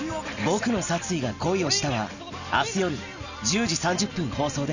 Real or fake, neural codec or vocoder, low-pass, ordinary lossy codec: real; none; 7.2 kHz; none